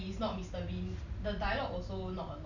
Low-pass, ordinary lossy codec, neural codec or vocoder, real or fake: 7.2 kHz; none; none; real